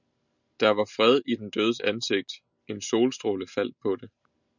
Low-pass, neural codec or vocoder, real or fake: 7.2 kHz; none; real